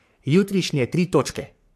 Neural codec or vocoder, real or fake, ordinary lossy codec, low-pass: codec, 44.1 kHz, 3.4 kbps, Pupu-Codec; fake; AAC, 96 kbps; 14.4 kHz